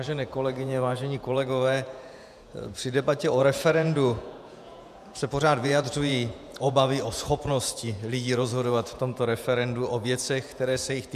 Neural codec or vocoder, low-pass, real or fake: vocoder, 48 kHz, 128 mel bands, Vocos; 14.4 kHz; fake